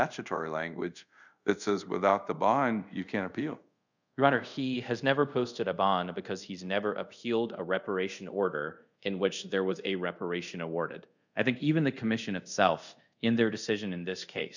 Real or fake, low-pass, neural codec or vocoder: fake; 7.2 kHz; codec, 24 kHz, 0.5 kbps, DualCodec